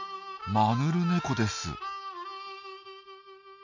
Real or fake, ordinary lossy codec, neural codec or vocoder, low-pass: real; none; none; 7.2 kHz